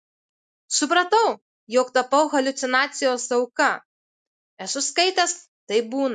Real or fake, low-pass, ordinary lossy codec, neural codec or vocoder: real; 9.9 kHz; MP3, 64 kbps; none